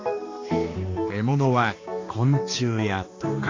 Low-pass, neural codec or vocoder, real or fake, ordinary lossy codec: 7.2 kHz; codec, 16 kHz, 2 kbps, X-Codec, HuBERT features, trained on balanced general audio; fake; AAC, 32 kbps